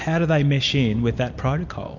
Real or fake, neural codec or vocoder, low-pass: real; none; 7.2 kHz